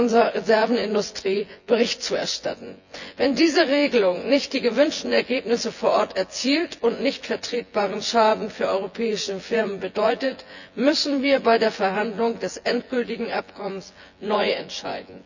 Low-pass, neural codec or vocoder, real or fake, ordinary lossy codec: 7.2 kHz; vocoder, 24 kHz, 100 mel bands, Vocos; fake; none